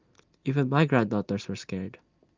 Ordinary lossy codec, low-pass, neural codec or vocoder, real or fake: Opus, 32 kbps; 7.2 kHz; none; real